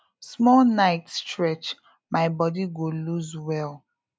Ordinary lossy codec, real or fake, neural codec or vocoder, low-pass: none; real; none; none